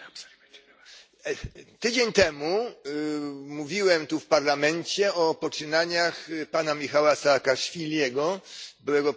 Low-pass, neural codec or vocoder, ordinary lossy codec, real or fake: none; none; none; real